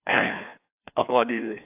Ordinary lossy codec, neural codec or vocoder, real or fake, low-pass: AAC, 32 kbps; codec, 16 kHz, 2 kbps, FreqCodec, larger model; fake; 3.6 kHz